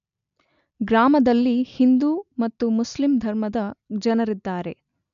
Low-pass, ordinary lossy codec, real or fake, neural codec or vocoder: 7.2 kHz; none; real; none